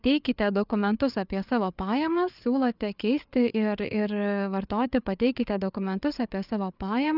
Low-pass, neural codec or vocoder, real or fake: 5.4 kHz; codec, 16 kHz, 4 kbps, FreqCodec, larger model; fake